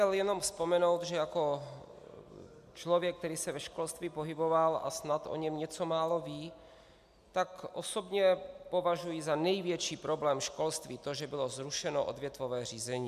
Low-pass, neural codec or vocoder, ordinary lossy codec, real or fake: 14.4 kHz; none; MP3, 96 kbps; real